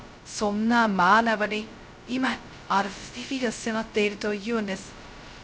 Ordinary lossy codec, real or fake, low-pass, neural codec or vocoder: none; fake; none; codec, 16 kHz, 0.2 kbps, FocalCodec